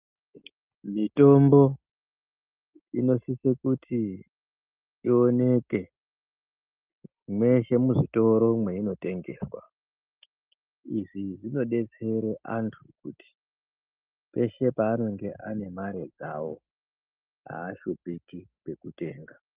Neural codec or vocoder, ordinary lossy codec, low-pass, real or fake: none; Opus, 24 kbps; 3.6 kHz; real